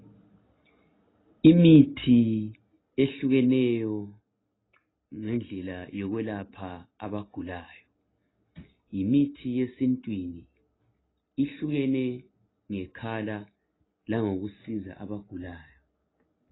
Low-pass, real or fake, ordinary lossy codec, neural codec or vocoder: 7.2 kHz; real; AAC, 16 kbps; none